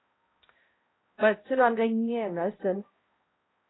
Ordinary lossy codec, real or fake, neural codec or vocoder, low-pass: AAC, 16 kbps; fake; codec, 16 kHz, 0.5 kbps, X-Codec, HuBERT features, trained on balanced general audio; 7.2 kHz